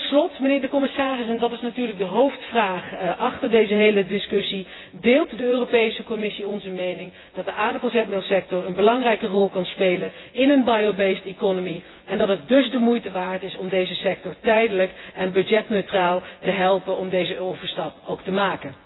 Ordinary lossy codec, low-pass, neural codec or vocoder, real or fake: AAC, 16 kbps; 7.2 kHz; vocoder, 24 kHz, 100 mel bands, Vocos; fake